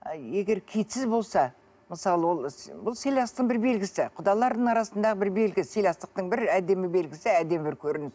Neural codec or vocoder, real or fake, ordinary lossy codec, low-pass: none; real; none; none